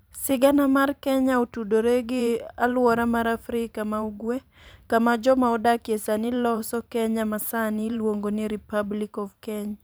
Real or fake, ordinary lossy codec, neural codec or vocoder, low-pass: fake; none; vocoder, 44.1 kHz, 128 mel bands every 512 samples, BigVGAN v2; none